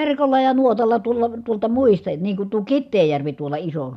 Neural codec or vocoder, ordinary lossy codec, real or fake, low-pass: none; none; real; 14.4 kHz